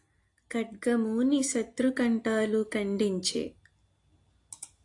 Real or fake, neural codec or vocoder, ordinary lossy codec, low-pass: real; none; AAC, 48 kbps; 10.8 kHz